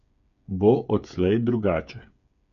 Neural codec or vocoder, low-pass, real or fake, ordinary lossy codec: codec, 16 kHz, 8 kbps, FreqCodec, smaller model; 7.2 kHz; fake; none